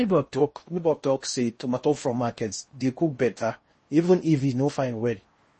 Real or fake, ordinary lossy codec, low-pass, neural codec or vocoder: fake; MP3, 32 kbps; 10.8 kHz; codec, 16 kHz in and 24 kHz out, 0.6 kbps, FocalCodec, streaming, 4096 codes